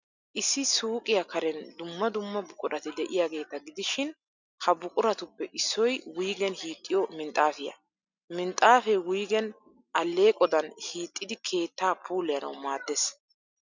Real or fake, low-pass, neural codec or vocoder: real; 7.2 kHz; none